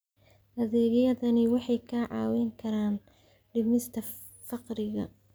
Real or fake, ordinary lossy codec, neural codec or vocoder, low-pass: real; none; none; none